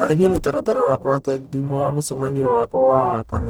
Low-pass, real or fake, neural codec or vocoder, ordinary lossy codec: none; fake; codec, 44.1 kHz, 0.9 kbps, DAC; none